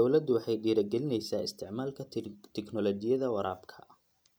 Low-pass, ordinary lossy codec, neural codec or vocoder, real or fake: none; none; none; real